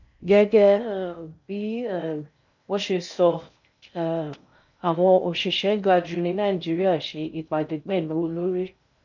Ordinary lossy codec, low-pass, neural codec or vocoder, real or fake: none; 7.2 kHz; codec, 16 kHz in and 24 kHz out, 0.6 kbps, FocalCodec, streaming, 2048 codes; fake